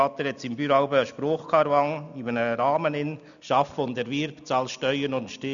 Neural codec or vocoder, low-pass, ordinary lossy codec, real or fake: none; 7.2 kHz; none; real